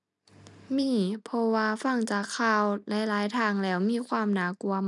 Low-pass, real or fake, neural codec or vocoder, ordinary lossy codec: 10.8 kHz; real; none; none